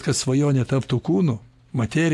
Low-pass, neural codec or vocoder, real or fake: 14.4 kHz; none; real